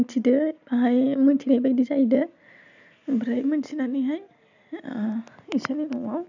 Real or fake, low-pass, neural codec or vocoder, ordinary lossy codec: real; 7.2 kHz; none; none